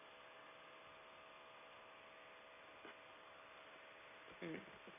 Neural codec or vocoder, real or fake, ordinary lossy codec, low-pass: none; real; none; 3.6 kHz